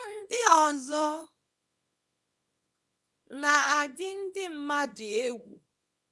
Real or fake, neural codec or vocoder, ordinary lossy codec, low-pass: fake; codec, 24 kHz, 0.9 kbps, WavTokenizer, small release; none; none